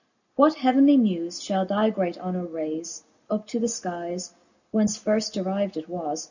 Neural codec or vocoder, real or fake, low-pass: none; real; 7.2 kHz